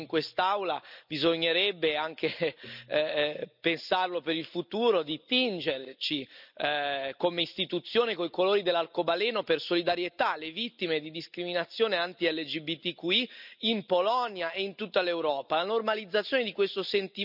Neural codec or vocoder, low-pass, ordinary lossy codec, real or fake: none; 5.4 kHz; none; real